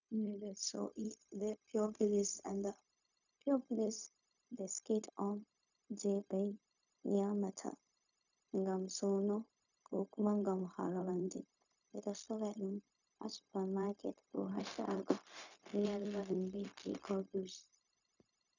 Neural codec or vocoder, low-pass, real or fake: codec, 16 kHz, 0.4 kbps, LongCat-Audio-Codec; 7.2 kHz; fake